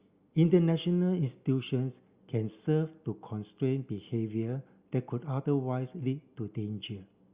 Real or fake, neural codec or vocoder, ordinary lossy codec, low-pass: real; none; Opus, 64 kbps; 3.6 kHz